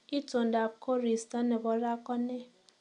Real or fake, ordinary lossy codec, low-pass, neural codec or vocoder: real; none; 10.8 kHz; none